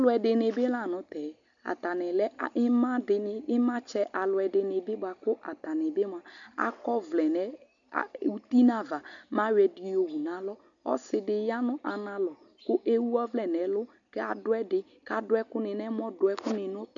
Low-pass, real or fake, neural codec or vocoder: 7.2 kHz; real; none